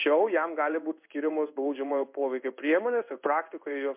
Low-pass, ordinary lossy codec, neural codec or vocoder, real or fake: 3.6 kHz; MP3, 32 kbps; codec, 16 kHz in and 24 kHz out, 1 kbps, XY-Tokenizer; fake